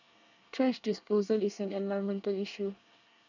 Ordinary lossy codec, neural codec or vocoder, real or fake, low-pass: none; codec, 24 kHz, 1 kbps, SNAC; fake; 7.2 kHz